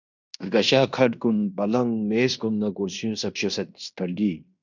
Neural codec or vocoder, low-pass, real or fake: codec, 16 kHz in and 24 kHz out, 0.9 kbps, LongCat-Audio-Codec, fine tuned four codebook decoder; 7.2 kHz; fake